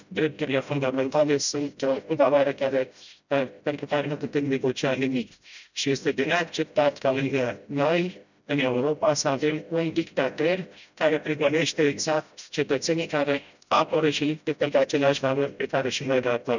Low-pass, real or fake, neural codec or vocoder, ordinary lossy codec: 7.2 kHz; fake; codec, 16 kHz, 0.5 kbps, FreqCodec, smaller model; none